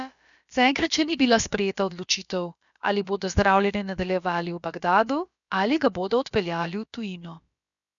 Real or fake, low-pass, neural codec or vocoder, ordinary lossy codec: fake; 7.2 kHz; codec, 16 kHz, about 1 kbps, DyCAST, with the encoder's durations; none